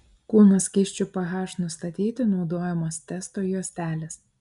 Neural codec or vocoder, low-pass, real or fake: none; 10.8 kHz; real